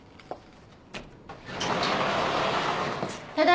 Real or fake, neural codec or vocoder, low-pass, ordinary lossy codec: real; none; none; none